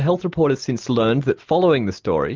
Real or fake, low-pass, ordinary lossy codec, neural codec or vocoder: real; 7.2 kHz; Opus, 24 kbps; none